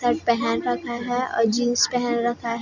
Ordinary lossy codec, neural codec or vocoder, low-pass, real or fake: none; none; 7.2 kHz; real